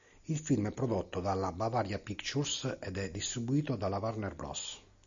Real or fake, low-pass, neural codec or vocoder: real; 7.2 kHz; none